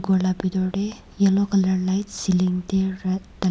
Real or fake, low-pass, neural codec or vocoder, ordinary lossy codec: real; none; none; none